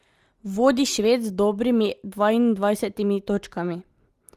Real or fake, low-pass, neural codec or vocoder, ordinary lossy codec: real; 14.4 kHz; none; Opus, 24 kbps